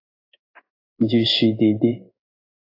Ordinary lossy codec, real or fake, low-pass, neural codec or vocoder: AAC, 32 kbps; fake; 5.4 kHz; codec, 16 kHz in and 24 kHz out, 1 kbps, XY-Tokenizer